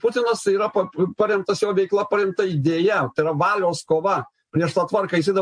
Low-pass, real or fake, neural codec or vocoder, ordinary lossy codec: 9.9 kHz; real; none; MP3, 48 kbps